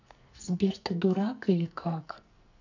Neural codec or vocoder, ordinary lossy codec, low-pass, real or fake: codec, 44.1 kHz, 2.6 kbps, SNAC; none; 7.2 kHz; fake